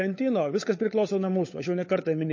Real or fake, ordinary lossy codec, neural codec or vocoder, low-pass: fake; MP3, 32 kbps; codec, 16 kHz, 16 kbps, FunCodec, trained on LibriTTS, 50 frames a second; 7.2 kHz